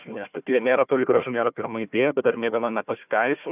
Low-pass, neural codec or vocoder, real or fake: 3.6 kHz; codec, 16 kHz, 1 kbps, FunCodec, trained on Chinese and English, 50 frames a second; fake